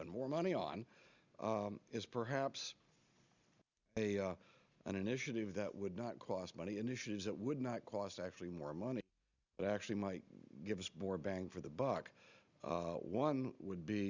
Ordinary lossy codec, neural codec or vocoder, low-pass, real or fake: Opus, 64 kbps; none; 7.2 kHz; real